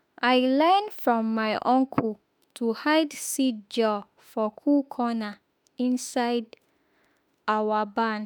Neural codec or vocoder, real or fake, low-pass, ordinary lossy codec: autoencoder, 48 kHz, 32 numbers a frame, DAC-VAE, trained on Japanese speech; fake; none; none